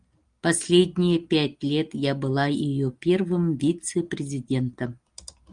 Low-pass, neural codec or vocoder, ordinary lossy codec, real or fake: 9.9 kHz; none; Opus, 32 kbps; real